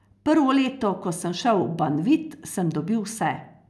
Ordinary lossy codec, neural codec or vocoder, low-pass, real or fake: none; none; none; real